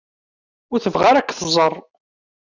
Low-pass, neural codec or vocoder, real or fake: 7.2 kHz; none; real